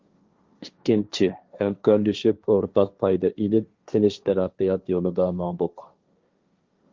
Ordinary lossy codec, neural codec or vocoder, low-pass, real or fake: Opus, 32 kbps; codec, 16 kHz, 1.1 kbps, Voila-Tokenizer; 7.2 kHz; fake